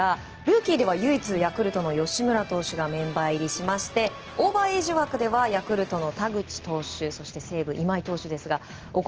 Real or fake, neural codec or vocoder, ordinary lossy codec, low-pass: real; none; Opus, 16 kbps; 7.2 kHz